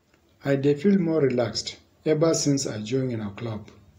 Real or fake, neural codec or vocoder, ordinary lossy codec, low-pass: real; none; AAC, 48 kbps; 19.8 kHz